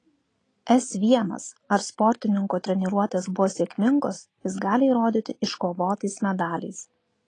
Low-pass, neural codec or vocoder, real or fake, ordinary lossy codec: 9.9 kHz; none; real; AAC, 32 kbps